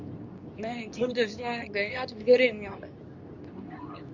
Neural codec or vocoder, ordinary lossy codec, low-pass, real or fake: codec, 24 kHz, 0.9 kbps, WavTokenizer, medium speech release version 2; Opus, 64 kbps; 7.2 kHz; fake